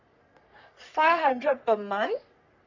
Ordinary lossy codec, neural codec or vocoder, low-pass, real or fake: none; codec, 44.1 kHz, 3.4 kbps, Pupu-Codec; 7.2 kHz; fake